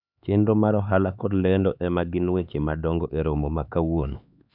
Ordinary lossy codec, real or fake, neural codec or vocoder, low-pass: none; fake; codec, 16 kHz, 4 kbps, X-Codec, HuBERT features, trained on LibriSpeech; 5.4 kHz